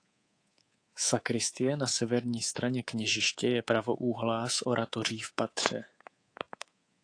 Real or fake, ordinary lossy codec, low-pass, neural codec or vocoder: fake; AAC, 48 kbps; 9.9 kHz; codec, 24 kHz, 3.1 kbps, DualCodec